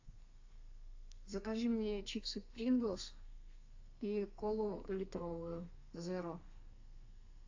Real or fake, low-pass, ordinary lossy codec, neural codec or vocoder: fake; 7.2 kHz; AAC, 48 kbps; codec, 32 kHz, 1.9 kbps, SNAC